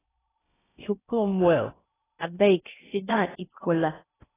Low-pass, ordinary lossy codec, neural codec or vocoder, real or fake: 3.6 kHz; AAC, 16 kbps; codec, 16 kHz in and 24 kHz out, 0.6 kbps, FocalCodec, streaming, 2048 codes; fake